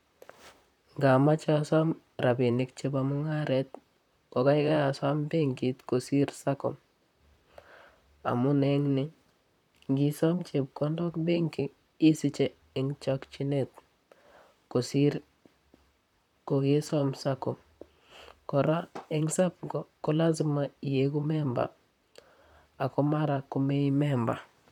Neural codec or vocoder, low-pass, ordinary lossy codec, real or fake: vocoder, 44.1 kHz, 128 mel bands, Pupu-Vocoder; 19.8 kHz; none; fake